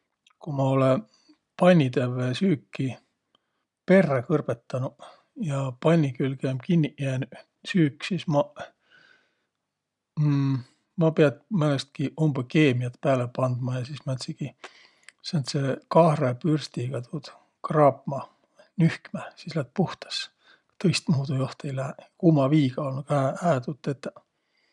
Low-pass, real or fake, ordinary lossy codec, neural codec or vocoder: 10.8 kHz; real; none; none